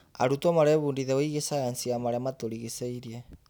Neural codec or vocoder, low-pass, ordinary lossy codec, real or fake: none; none; none; real